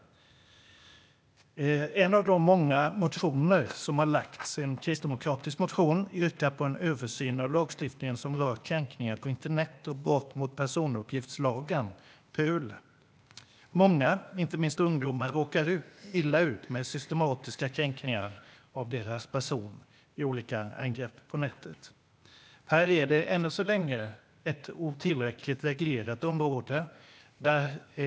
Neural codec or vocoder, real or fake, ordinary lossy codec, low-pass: codec, 16 kHz, 0.8 kbps, ZipCodec; fake; none; none